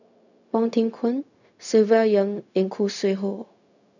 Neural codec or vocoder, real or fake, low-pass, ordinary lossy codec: codec, 16 kHz, 0.4 kbps, LongCat-Audio-Codec; fake; 7.2 kHz; none